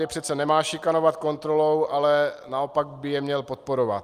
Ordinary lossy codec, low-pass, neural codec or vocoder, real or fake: Opus, 32 kbps; 14.4 kHz; none; real